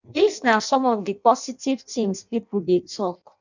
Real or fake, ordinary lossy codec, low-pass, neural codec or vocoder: fake; none; 7.2 kHz; codec, 16 kHz in and 24 kHz out, 0.6 kbps, FireRedTTS-2 codec